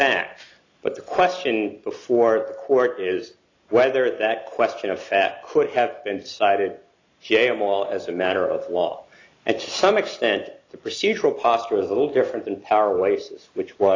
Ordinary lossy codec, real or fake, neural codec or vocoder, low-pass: AAC, 32 kbps; real; none; 7.2 kHz